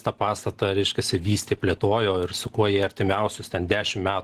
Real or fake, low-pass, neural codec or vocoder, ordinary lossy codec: real; 14.4 kHz; none; Opus, 16 kbps